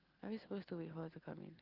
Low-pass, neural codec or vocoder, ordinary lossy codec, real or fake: 5.4 kHz; none; Opus, 32 kbps; real